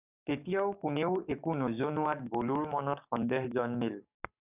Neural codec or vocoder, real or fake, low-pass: codec, 44.1 kHz, 7.8 kbps, DAC; fake; 3.6 kHz